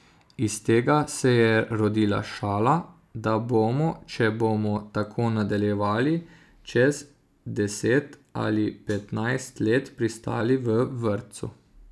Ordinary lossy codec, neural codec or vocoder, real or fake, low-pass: none; none; real; none